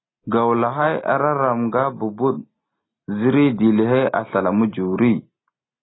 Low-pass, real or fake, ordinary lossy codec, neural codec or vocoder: 7.2 kHz; real; AAC, 16 kbps; none